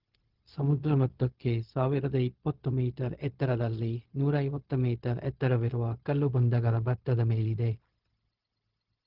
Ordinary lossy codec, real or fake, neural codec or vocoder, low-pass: Opus, 16 kbps; fake; codec, 16 kHz, 0.4 kbps, LongCat-Audio-Codec; 5.4 kHz